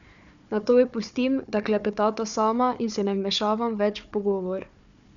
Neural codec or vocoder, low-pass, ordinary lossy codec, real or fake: codec, 16 kHz, 4 kbps, FunCodec, trained on Chinese and English, 50 frames a second; 7.2 kHz; none; fake